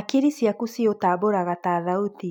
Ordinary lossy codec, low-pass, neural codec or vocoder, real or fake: none; 19.8 kHz; none; real